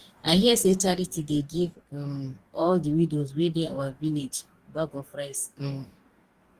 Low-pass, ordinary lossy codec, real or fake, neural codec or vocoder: 14.4 kHz; Opus, 24 kbps; fake; codec, 44.1 kHz, 2.6 kbps, DAC